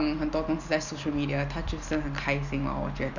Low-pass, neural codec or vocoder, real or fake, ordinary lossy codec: 7.2 kHz; none; real; none